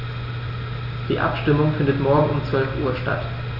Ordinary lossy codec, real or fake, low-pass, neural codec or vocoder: none; real; 5.4 kHz; none